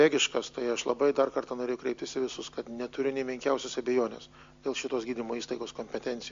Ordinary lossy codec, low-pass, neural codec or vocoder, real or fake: MP3, 48 kbps; 7.2 kHz; none; real